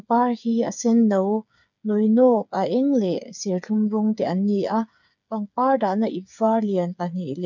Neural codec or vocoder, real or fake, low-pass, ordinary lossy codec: codec, 16 kHz, 8 kbps, FreqCodec, smaller model; fake; 7.2 kHz; none